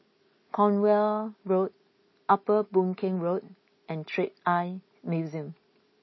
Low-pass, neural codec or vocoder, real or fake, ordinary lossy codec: 7.2 kHz; none; real; MP3, 24 kbps